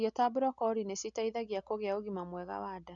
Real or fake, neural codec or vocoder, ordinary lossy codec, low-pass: real; none; none; 7.2 kHz